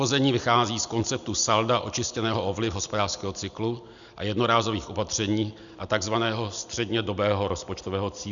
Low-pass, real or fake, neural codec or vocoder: 7.2 kHz; real; none